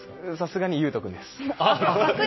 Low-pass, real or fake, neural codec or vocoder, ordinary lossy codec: 7.2 kHz; real; none; MP3, 24 kbps